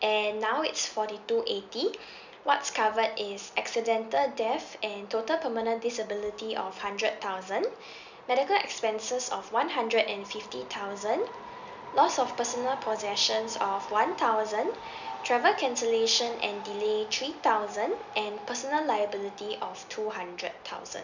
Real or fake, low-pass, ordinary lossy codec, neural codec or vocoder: real; 7.2 kHz; none; none